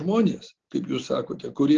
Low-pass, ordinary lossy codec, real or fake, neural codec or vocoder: 7.2 kHz; Opus, 16 kbps; real; none